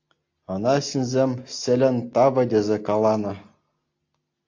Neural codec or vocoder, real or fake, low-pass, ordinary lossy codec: none; real; 7.2 kHz; AAC, 48 kbps